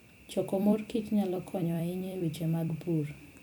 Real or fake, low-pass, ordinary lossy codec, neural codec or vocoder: fake; none; none; vocoder, 44.1 kHz, 128 mel bands every 256 samples, BigVGAN v2